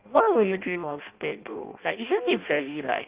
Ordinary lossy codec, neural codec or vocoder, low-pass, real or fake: Opus, 32 kbps; codec, 16 kHz in and 24 kHz out, 0.6 kbps, FireRedTTS-2 codec; 3.6 kHz; fake